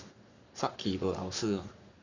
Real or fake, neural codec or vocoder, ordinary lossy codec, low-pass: fake; codec, 44.1 kHz, 7.8 kbps, Pupu-Codec; Opus, 64 kbps; 7.2 kHz